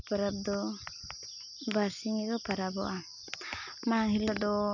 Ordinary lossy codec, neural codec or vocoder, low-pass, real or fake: none; none; 7.2 kHz; real